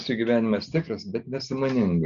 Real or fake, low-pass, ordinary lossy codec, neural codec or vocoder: real; 7.2 kHz; Opus, 64 kbps; none